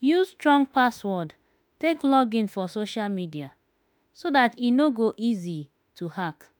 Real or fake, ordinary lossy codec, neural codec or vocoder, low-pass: fake; none; autoencoder, 48 kHz, 32 numbers a frame, DAC-VAE, trained on Japanese speech; none